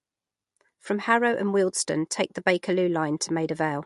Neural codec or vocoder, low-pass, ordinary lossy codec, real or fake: none; 14.4 kHz; MP3, 48 kbps; real